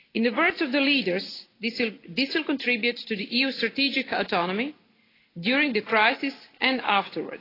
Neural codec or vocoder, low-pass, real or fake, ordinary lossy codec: none; 5.4 kHz; real; AAC, 24 kbps